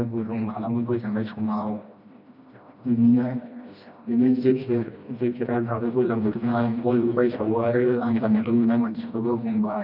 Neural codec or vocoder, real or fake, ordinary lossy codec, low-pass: codec, 16 kHz, 1 kbps, FreqCodec, smaller model; fake; none; 5.4 kHz